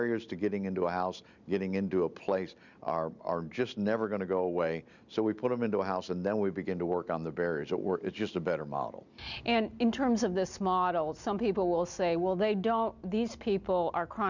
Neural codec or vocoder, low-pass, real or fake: none; 7.2 kHz; real